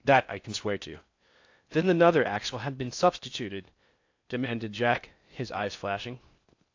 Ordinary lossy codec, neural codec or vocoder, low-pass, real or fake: AAC, 48 kbps; codec, 16 kHz in and 24 kHz out, 0.6 kbps, FocalCodec, streaming, 4096 codes; 7.2 kHz; fake